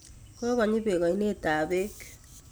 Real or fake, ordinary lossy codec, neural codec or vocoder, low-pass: real; none; none; none